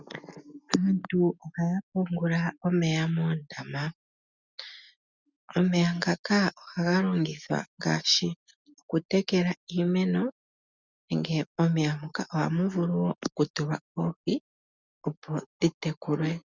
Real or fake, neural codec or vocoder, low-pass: real; none; 7.2 kHz